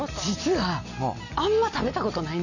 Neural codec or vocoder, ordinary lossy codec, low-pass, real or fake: none; none; 7.2 kHz; real